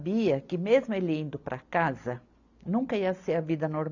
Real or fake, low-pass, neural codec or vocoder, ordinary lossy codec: real; 7.2 kHz; none; none